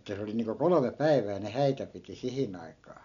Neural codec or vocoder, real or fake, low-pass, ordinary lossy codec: none; real; 7.2 kHz; none